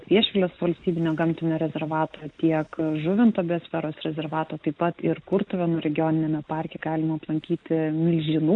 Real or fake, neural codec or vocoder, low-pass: real; none; 9.9 kHz